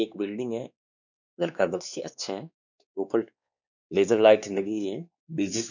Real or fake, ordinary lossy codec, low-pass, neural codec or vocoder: fake; none; 7.2 kHz; codec, 16 kHz, 2 kbps, X-Codec, WavLM features, trained on Multilingual LibriSpeech